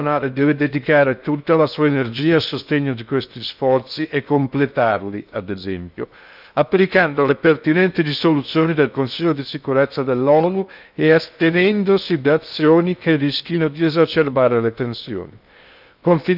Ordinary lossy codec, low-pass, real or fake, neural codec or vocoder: none; 5.4 kHz; fake; codec, 16 kHz in and 24 kHz out, 0.6 kbps, FocalCodec, streaming, 2048 codes